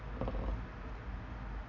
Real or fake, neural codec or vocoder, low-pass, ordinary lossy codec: real; none; 7.2 kHz; none